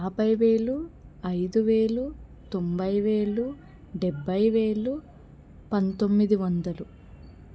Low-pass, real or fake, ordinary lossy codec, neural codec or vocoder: none; real; none; none